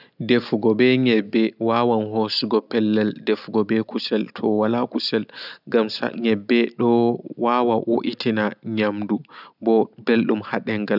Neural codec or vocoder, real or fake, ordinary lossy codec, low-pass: none; real; none; 5.4 kHz